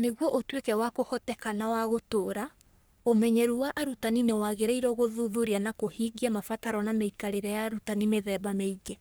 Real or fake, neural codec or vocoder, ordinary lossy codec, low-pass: fake; codec, 44.1 kHz, 3.4 kbps, Pupu-Codec; none; none